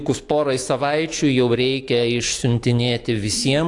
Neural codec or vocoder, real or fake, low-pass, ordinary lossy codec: autoencoder, 48 kHz, 128 numbers a frame, DAC-VAE, trained on Japanese speech; fake; 10.8 kHz; AAC, 48 kbps